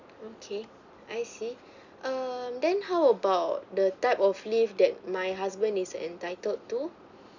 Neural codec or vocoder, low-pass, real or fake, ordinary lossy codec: none; 7.2 kHz; real; none